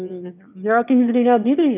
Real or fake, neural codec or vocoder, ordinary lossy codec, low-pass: fake; autoencoder, 22.05 kHz, a latent of 192 numbers a frame, VITS, trained on one speaker; none; 3.6 kHz